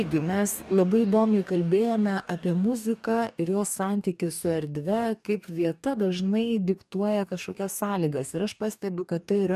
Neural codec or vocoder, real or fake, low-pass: codec, 44.1 kHz, 2.6 kbps, DAC; fake; 14.4 kHz